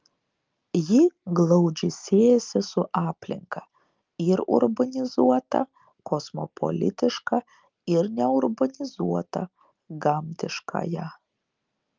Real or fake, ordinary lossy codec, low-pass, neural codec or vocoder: real; Opus, 24 kbps; 7.2 kHz; none